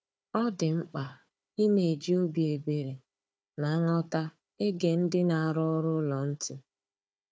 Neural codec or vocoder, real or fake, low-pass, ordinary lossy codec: codec, 16 kHz, 4 kbps, FunCodec, trained on Chinese and English, 50 frames a second; fake; none; none